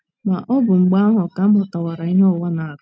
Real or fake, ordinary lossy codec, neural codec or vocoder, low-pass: real; none; none; none